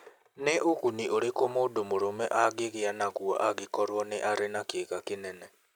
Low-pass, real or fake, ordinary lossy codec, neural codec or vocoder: none; real; none; none